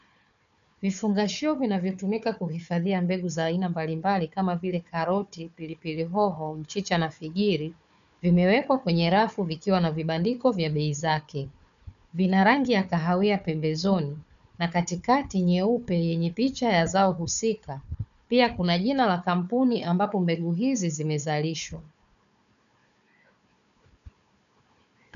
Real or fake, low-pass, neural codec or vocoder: fake; 7.2 kHz; codec, 16 kHz, 4 kbps, FunCodec, trained on Chinese and English, 50 frames a second